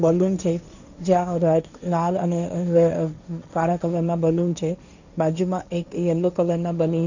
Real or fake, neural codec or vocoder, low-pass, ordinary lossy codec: fake; codec, 16 kHz, 1.1 kbps, Voila-Tokenizer; 7.2 kHz; none